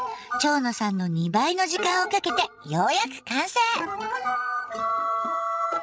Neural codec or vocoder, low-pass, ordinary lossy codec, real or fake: codec, 16 kHz, 16 kbps, FreqCodec, larger model; none; none; fake